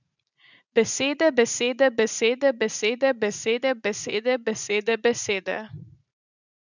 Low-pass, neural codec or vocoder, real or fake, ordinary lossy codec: 7.2 kHz; vocoder, 44.1 kHz, 80 mel bands, Vocos; fake; none